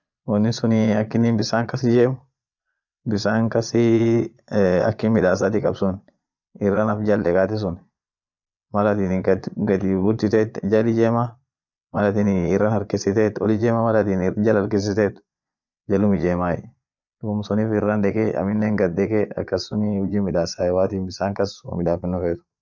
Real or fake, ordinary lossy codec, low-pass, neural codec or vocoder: fake; none; 7.2 kHz; vocoder, 22.05 kHz, 80 mel bands, Vocos